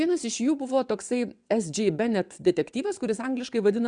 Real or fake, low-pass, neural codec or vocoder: fake; 9.9 kHz; vocoder, 22.05 kHz, 80 mel bands, WaveNeXt